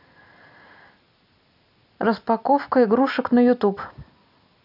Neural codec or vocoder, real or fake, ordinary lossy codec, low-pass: none; real; none; 5.4 kHz